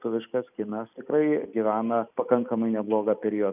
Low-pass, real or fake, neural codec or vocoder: 3.6 kHz; fake; vocoder, 44.1 kHz, 128 mel bands every 256 samples, BigVGAN v2